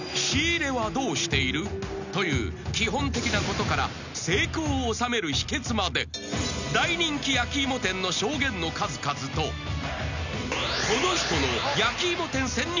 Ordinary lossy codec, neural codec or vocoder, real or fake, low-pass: none; none; real; 7.2 kHz